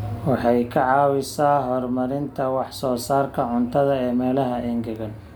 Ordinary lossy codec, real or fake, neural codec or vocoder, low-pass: none; real; none; none